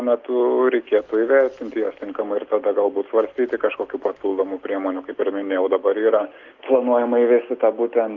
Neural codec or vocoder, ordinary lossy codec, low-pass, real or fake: none; Opus, 24 kbps; 7.2 kHz; real